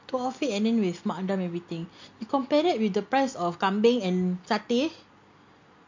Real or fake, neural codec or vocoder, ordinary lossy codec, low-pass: real; none; MP3, 48 kbps; 7.2 kHz